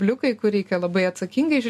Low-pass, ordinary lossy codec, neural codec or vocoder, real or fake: 14.4 kHz; MP3, 64 kbps; none; real